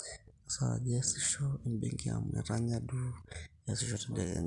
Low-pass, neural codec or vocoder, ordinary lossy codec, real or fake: 10.8 kHz; none; none; real